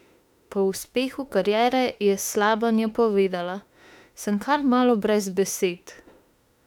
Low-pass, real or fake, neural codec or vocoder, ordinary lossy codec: 19.8 kHz; fake; autoencoder, 48 kHz, 32 numbers a frame, DAC-VAE, trained on Japanese speech; none